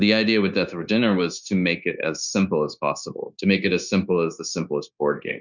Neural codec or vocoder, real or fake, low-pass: codec, 16 kHz, 0.9 kbps, LongCat-Audio-Codec; fake; 7.2 kHz